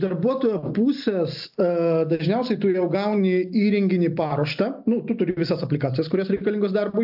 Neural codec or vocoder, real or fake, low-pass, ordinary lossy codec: none; real; 5.4 kHz; AAC, 48 kbps